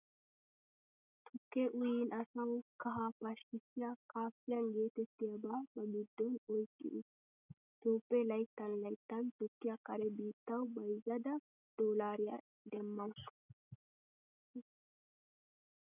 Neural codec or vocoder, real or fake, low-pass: none; real; 3.6 kHz